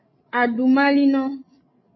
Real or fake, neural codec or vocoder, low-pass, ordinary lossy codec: fake; codec, 16 kHz, 16 kbps, FreqCodec, larger model; 7.2 kHz; MP3, 24 kbps